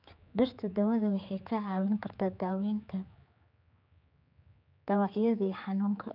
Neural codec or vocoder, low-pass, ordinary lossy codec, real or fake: codec, 16 kHz, 4 kbps, X-Codec, HuBERT features, trained on general audio; 5.4 kHz; none; fake